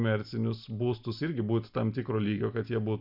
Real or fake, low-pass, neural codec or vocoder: real; 5.4 kHz; none